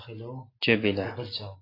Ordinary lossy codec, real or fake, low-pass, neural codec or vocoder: AAC, 24 kbps; real; 5.4 kHz; none